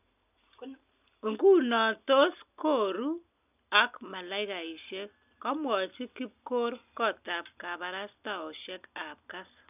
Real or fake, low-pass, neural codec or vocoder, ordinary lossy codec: real; 3.6 kHz; none; none